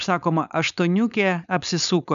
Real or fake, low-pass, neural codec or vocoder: fake; 7.2 kHz; codec, 16 kHz, 4.8 kbps, FACodec